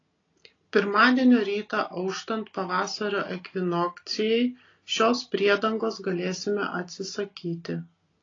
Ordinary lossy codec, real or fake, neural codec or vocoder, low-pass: AAC, 32 kbps; real; none; 7.2 kHz